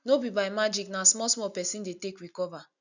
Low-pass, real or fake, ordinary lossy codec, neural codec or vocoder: 7.2 kHz; real; none; none